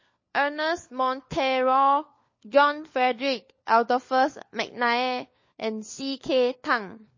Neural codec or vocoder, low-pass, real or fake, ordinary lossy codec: codec, 16 kHz, 4 kbps, FunCodec, trained on LibriTTS, 50 frames a second; 7.2 kHz; fake; MP3, 32 kbps